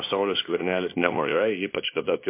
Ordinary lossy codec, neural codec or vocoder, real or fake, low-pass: MP3, 24 kbps; codec, 24 kHz, 0.9 kbps, WavTokenizer, small release; fake; 3.6 kHz